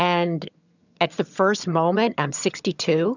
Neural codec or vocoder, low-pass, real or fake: vocoder, 22.05 kHz, 80 mel bands, HiFi-GAN; 7.2 kHz; fake